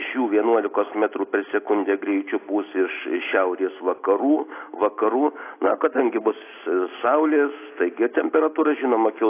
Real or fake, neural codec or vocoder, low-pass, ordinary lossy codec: real; none; 3.6 kHz; AAC, 24 kbps